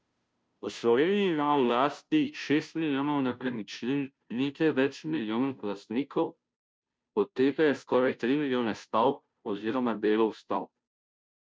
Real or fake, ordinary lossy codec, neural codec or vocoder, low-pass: fake; none; codec, 16 kHz, 0.5 kbps, FunCodec, trained on Chinese and English, 25 frames a second; none